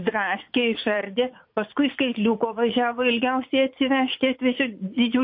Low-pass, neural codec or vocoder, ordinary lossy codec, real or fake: 5.4 kHz; vocoder, 44.1 kHz, 80 mel bands, Vocos; MP3, 32 kbps; fake